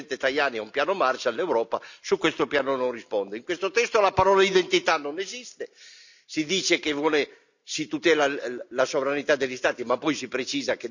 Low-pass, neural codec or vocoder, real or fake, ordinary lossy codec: 7.2 kHz; none; real; none